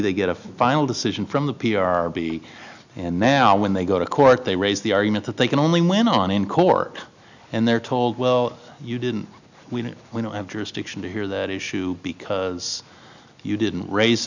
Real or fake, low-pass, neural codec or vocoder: real; 7.2 kHz; none